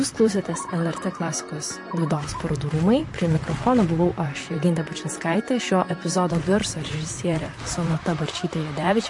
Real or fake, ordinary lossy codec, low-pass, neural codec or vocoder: fake; MP3, 48 kbps; 10.8 kHz; vocoder, 44.1 kHz, 128 mel bands, Pupu-Vocoder